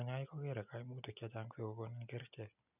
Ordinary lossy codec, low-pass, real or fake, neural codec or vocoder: none; 3.6 kHz; real; none